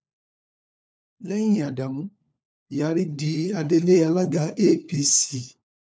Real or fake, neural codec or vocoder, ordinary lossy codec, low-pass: fake; codec, 16 kHz, 4 kbps, FunCodec, trained on LibriTTS, 50 frames a second; none; none